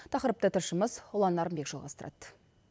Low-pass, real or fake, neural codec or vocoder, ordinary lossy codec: none; real; none; none